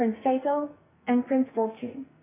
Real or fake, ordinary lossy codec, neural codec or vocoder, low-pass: fake; AAC, 16 kbps; codec, 44.1 kHz, 2.6 kbps, SNAC; 3.6 kHz